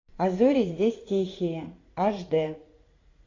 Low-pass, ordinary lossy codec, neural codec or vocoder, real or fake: 7.2 kHz; AAC, 32 kbps; vocoder, 44.1 kHz, 80 mel bands, Vocos; fake